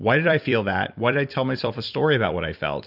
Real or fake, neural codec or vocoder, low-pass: fake; vocoder, 44.1 kHz, 128 mel bands every 256 samples, BigVGAN v2; 5.4 kHz